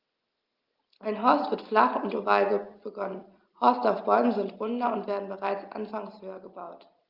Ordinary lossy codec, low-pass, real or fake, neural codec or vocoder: Opus, 24 kbps; 5.4 kHz; real; none